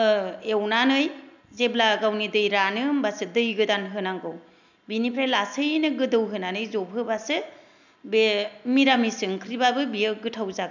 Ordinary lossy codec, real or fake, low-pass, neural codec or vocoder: none; real; 7.2 kHz; none